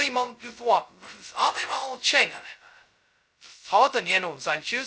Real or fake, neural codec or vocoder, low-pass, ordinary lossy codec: fake; codec, 16 kHz, 0.2 kbps, FocalCodec; none; none